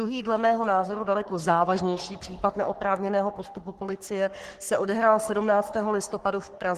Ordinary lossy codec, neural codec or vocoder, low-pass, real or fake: Opus, 16 kbps; codec, 44.1 kHz, 3.4 kbps, Pupu-Codec; 14.4 kHz; fake